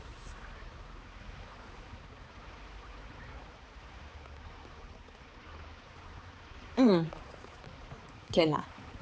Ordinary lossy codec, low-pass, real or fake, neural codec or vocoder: none; none; fake; codec, 16 kHz, 4 kbps, X-Codec, HuBERT features, trained on balanced general audio